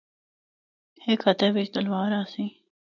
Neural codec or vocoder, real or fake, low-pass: none; real; 7.2 kHz